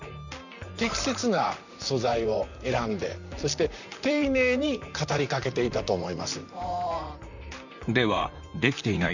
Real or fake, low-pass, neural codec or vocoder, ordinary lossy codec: fake; 7.2 kHz; vocoder, 44.1 kHz, 128 mel bands, Pupu-Vocoder; none